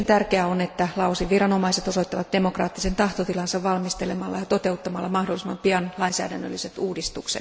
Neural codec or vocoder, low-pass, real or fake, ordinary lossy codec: none; none; real; none